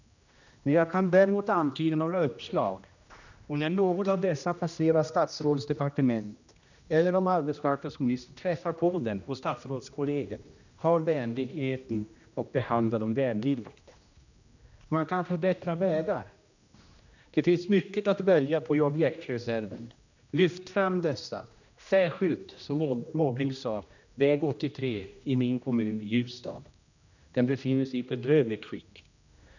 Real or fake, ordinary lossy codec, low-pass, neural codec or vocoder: fake; none; 7.2 kHz; codec, 16 kHz, 1 kbps, X-Codec, HuBERT features, trained on general audio